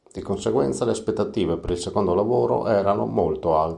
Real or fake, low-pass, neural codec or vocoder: real; 10.8 kHz; none